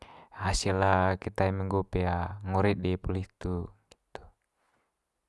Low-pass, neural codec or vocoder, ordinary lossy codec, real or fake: none; none; none; real